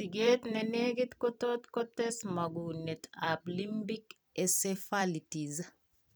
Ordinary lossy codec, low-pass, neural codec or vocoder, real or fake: none; none; vocoder, 44.1 kHz, 128 mel bands every 256 samples, BigVGAN v2; fake